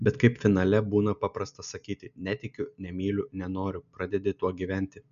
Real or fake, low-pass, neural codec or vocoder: real; 7.2 kHz; none